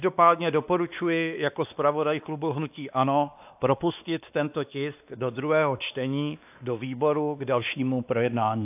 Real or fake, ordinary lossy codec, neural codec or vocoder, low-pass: fake; AAC, 32 kbps; codec, 16 kHz, 2 kbps, X-Codec, WavLM features, trained on Multilingual LibriSpeech; 3.6 kHz